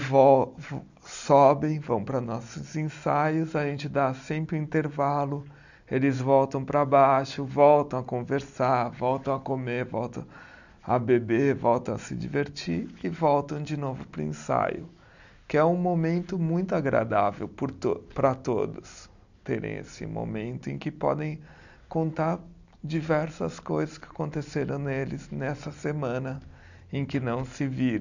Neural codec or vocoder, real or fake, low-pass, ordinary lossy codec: none; real; 7.2 kHz; none